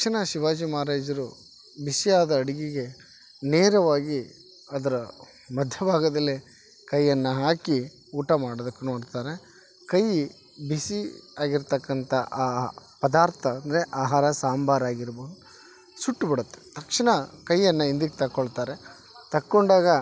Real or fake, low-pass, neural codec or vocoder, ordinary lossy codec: real; none; none; none